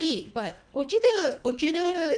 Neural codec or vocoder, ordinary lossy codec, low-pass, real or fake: codec, 24 kHz, 1.5 kbps, HILCodec; none; 9.9 kHz; fake